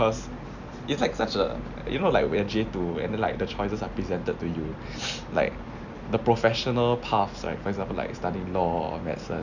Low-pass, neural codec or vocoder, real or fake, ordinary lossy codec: 7.2 kHz; none; real; none